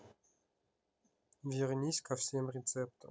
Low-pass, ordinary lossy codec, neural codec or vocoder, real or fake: none; none; none; real